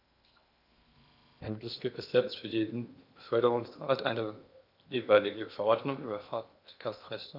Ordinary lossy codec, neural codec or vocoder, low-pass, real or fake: none; codec, 16 kHz in and 24 kHz out, 0.8 kbps, FocalCodec, streaming, 65536 codes; 5.4 kHz; fake